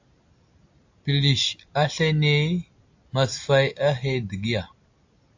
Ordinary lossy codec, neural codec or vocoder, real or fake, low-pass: AAC, 48 kbps; none; real; 7.2 kHz